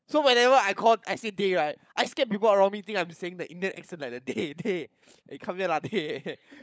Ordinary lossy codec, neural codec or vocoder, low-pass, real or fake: none; codec, 16 kHz, 16 kbps, FunCodec, trained on LibriTTS, 50 frames a second; none; fake